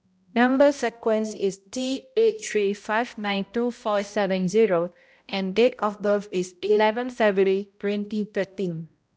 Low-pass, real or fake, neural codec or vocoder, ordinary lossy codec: none; fake; codec, 16 kHz, 0.5 kbps, X-Codec, HuBERT features, trained on balanced general audio; none